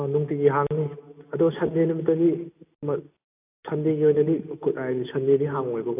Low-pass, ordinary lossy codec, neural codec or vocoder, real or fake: 3.6 kHz; AAC, 32 kbps; none; real